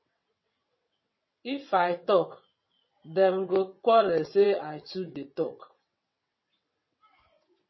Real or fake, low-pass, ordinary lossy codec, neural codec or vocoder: fake; 7.2 kHz; MP3, 24 kbps; vocoder, 22.05 kHz, 80 mel bands, WaveNeXt